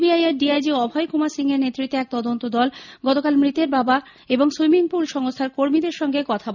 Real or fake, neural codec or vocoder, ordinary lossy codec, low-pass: real; none; none; 7.2 kHz